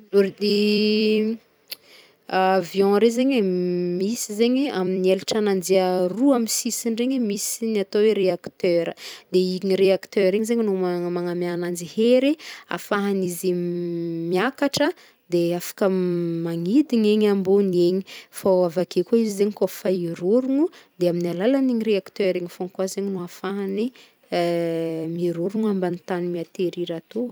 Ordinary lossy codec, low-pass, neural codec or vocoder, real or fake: none; none; vocoder, 44.1 kHz, 128 mel bands every 256 samples, BigVGAN v2; fake